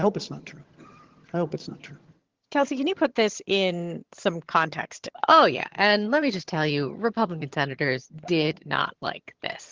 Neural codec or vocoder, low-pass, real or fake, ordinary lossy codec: vocoder, 22.05 kHz, 80 mel bands, HiFi-GAN; 7.2 kHz; fake; Opus, 16 kbps